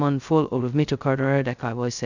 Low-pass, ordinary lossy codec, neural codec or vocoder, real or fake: 7.2 kHz; none; codec, 16 kHz, 0.2 kbps, FocalCodec; fake